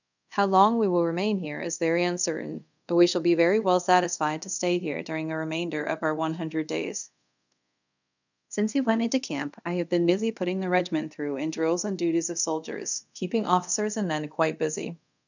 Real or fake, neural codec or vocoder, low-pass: fake; codec, 24 kHz, 0.5 kbps, DualCodec; 7.2 kHz